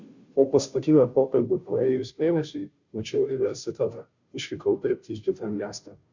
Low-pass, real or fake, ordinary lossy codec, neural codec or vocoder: 7.2 kHz; fake; Opus, 64 kbps; codec, 16 kHz, 0.5 kbps, FunCodec, trained on Chinese and English, 25 frames a second